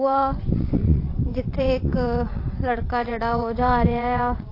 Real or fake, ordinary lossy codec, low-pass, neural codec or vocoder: fake; AAC, 32 kbps; 5.4 kHz; vocoder, 22.05 kHz, 80 mel bands, WaveNeXt